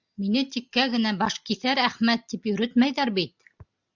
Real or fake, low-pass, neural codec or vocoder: real; 7.2 kHz; none